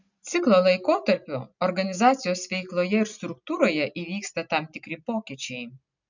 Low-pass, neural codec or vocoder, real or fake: 7.2 kHz; none; real